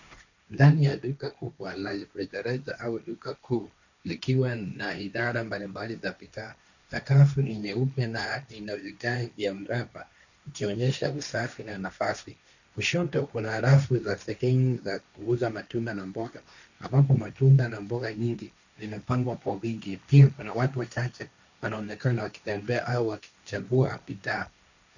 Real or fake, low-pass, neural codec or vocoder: fake; 7.2 kHz; codec, 16 kHz, 1.1 kbps, Voila-Tokenizer